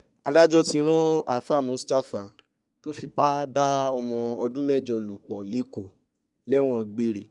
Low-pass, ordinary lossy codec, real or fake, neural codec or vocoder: 10.8 kHz; none; fake; codec, 24 kHz, 1 kbps, SNAC